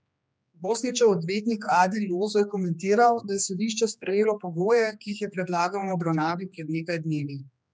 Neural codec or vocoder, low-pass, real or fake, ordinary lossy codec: codec, 16 kHz, 2 kbps, X-Codec, HuBERT features, trained on general audio; none; fake; none